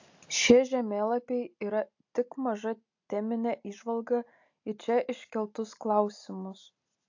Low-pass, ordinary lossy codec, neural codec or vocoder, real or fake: 7.2 kHz; AAC, 48 kbps; none; real